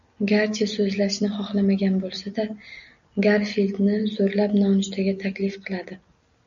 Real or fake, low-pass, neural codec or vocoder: real; 7.2 kHz; none